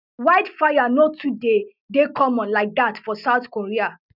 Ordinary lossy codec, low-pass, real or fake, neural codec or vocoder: none; 5.4 kHz; real; none